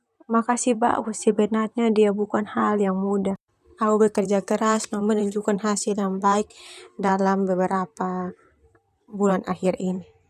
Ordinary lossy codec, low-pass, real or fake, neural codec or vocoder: none; 14.4 kHz; fake; vocoder, 44.1 kHz, 128 mel bands, Pupu-Vocoder